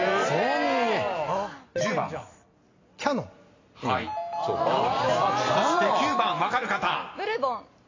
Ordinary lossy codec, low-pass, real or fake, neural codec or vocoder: AAC, 32 kbps; 7.2 kHz; real; none